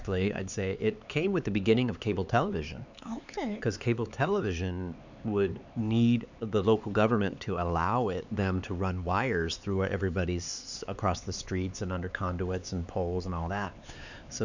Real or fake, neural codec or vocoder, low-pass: fake; codec, 16 kHz, 4 kbps, X-Codec, HuBERT features, trained on LibriSpeech; 7.2 kHz